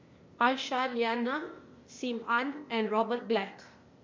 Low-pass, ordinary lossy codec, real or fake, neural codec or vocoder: 7.2 kHz; MP3, 48 kbps; fake; codec, 16 kHz, 0.8 kbps, ZipCodec